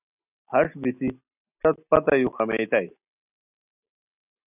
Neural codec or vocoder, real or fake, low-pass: none; real; 3.6 kHz